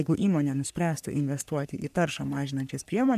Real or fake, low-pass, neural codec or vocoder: fake; 14.4 kHz; codec, 44.1 kHz, 3.4 kbps, Pupu-Codec